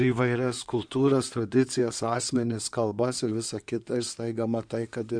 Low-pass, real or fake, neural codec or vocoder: 9.9 kHz; fake; codec, 16 kHz in and 24 kHz out, 2.2 kbps, FireRedTTS-2 codec